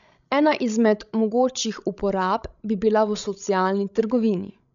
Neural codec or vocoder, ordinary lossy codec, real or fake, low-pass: codec, 16 kHz, 16 kbps, FreqCodec, larger model; none; fake; 7.2 kHz